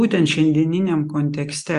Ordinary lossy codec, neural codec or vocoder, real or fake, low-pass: Opus, 64 kbps; none; real; 10.8 kHz